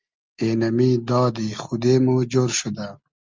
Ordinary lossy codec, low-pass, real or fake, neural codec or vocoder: Opus, 32 kbps; 7.2 kHz; real; none